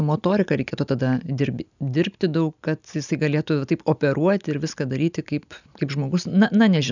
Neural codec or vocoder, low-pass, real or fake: none; 7.2 kHz; real